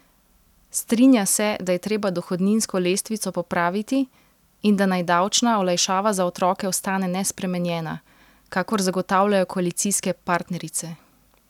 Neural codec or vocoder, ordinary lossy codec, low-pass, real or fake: none; none; 19.8 kHz; real